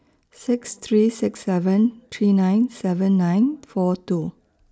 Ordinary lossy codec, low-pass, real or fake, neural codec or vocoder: none; none; real; none